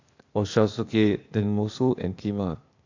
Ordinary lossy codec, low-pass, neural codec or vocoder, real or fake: AAC, 48 kbps; 7.2 kHz; codec, 16 kHz, 0.8 kbps, ZipCodec; fake